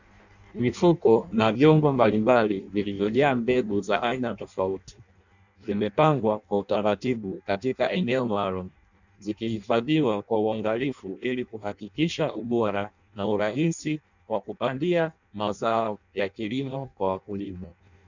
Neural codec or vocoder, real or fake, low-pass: codec, 16 kHz in and 24 kHz out, 0.6 kbps, FireRedTTS-2 codec; fake; 7.2 kHz